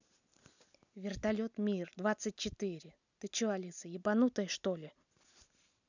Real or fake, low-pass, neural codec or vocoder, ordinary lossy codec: real; 7.2 kHz; none; none